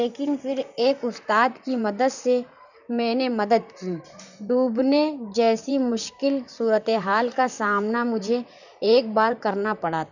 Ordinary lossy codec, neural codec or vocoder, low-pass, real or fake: none; vocoder, 44.1 kHz, 128 mel bands, Pupu-Vocoder; 7.2 kHz; fake